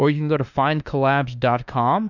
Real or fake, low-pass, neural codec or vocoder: fake; 7.2 kHz; autoencoder, 48 kHz, 32 numbers a frame, DAC-VAE, trained on Japanese speech